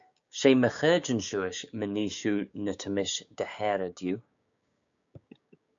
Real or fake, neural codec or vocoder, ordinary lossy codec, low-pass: fake; codec, 16 kHz, 6 kbps, DAC; MP3, 64 kbps; 7.2 kHz